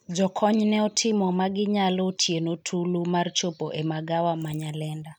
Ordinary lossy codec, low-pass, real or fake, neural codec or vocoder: none; 19.8 kHz; real; none